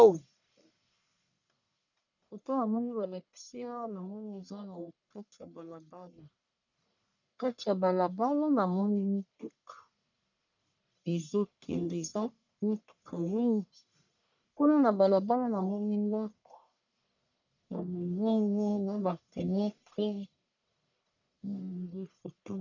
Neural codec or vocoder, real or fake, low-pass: codec, 44.1 kHz, 1.7 kbps, Pupu-Codec; fake; 7.2 kHz